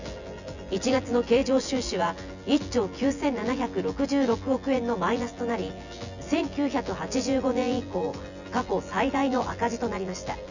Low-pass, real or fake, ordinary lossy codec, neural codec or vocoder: 7.2 kHz; fake; none; vocoder, 24 kHz, 100 mel bands, Vocos